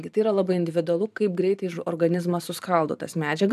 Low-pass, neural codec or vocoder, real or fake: 14.4 kHz; vocoder, 44.1 kHz, 128 mel bands every 512 samples, BigVGAN v2; fake